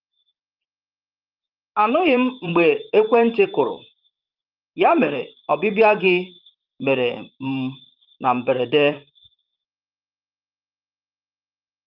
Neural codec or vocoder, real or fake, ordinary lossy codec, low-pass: vocoder, 44.1 kHz, 128 mel bands, Pupu-Vocoder; fake; Opus, 32 kbps; 5.4 kHz